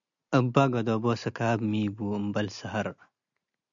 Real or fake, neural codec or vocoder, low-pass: real; none; 7.2 kHz